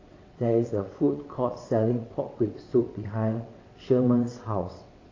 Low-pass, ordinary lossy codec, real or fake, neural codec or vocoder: 7.2 kHz; AAC, 32 kbps; fake; vocoder, 22.05 kHz, 80 mel bands, WaveNeXt